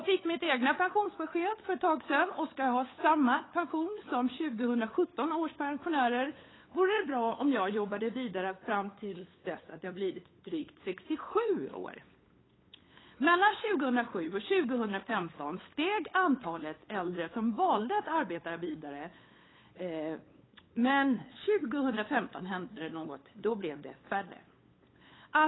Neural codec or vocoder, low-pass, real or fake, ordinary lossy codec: codec, 16 kHz, 8 kbps, FunCodec, trained on LibriTTS, 25 frames a second; 7.2 kHz; fake; AAC, 16 kbps